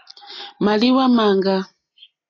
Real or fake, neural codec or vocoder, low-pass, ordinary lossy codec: real; none; 7.2 kHz; AAC, 32 kbps